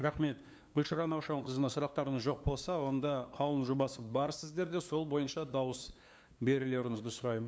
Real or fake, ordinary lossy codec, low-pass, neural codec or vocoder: fake; none; none; codec, 16 kHz, 2 kbps, FunCodec, trained on LibriTTS, 25 frames a second